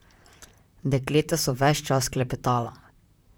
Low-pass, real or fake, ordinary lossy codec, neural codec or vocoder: none; fake; none; vocoder, 44.1 kHz, 128 mel bands, Pupu-Vocoder